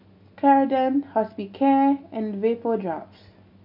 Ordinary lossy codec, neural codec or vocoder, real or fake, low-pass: MP3, 48 kbps; none; real; 5.4 kHz